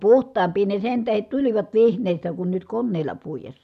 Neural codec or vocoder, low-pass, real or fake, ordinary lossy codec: none; 14.4 kHz; real; none